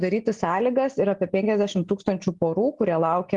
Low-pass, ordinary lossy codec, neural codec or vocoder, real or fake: 10.8 kHz; Opus, 24 kbps; none; real